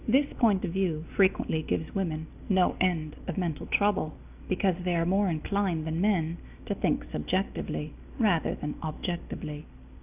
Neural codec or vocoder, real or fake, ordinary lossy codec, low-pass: none; real; AAC, 32 kbps; 3.6 kHz